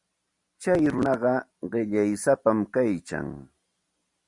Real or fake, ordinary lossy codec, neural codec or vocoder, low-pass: real; Opus, 64 kbps; none; 10.8 kHz